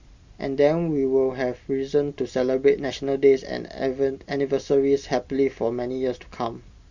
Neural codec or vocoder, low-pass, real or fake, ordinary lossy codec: none; 7.2 kHz; real; Opus, 64 kbps